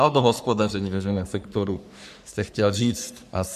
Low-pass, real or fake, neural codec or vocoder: 14.4 kHz; fake; codec, 44.1 kHz, 3.4 kbps, Pupu-Codec